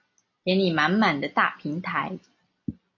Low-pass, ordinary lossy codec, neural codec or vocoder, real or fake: 7.2 kHz; MP3, 32 kbps; none; real